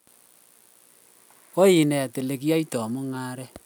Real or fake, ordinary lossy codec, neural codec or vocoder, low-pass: real; none; none; none